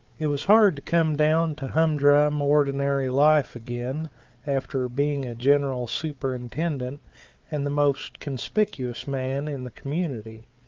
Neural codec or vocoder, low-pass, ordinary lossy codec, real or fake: codec, 16 kHz, 4 kbps, FunCodec, trained on Chinese and English, 50 frames a second; 7.2 kHz; Opus, 24 kbps; fake